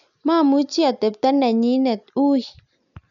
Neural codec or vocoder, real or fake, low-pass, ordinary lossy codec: none; real; 7.2 kHz; none